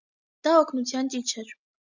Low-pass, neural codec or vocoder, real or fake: 7.2 kHz; none; real